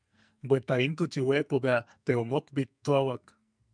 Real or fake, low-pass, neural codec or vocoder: fake; 9.9 kHz; codec, 44.1 kHz, 2.6 kbps, SNAC